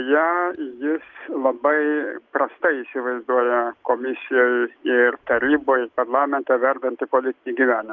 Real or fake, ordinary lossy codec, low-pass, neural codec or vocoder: real; Opus, 32 kbps; 7.2 kHz; none